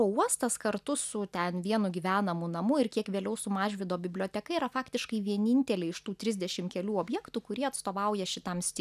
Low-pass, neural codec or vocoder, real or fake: 14.4 kHz; none; real